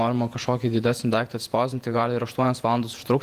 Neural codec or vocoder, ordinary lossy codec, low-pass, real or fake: vocoder, 48 kHz, 128 mel bands, Vocos; Opus, 32 kbps; 14.4 kHz; fake